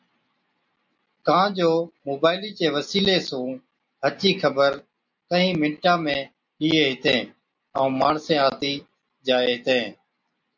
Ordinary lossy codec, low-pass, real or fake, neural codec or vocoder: MP3, 64 kbps; 7.2 kHz; real; none